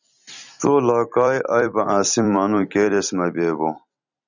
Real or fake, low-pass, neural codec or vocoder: fake; 7.2 kHz; vocoder, 44.1 kHz, 128 mel bands every 256 samples, BigVGAN v2